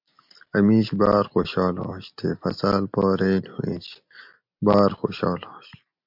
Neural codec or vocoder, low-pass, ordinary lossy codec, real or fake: none; 5.4 kHz; MP3, 48 kbps; real